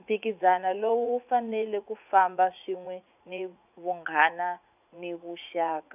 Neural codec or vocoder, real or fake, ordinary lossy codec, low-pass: vocoder, 44.1 kHz, 80 mel bands, Vocos; fake; none; 3.6 kHz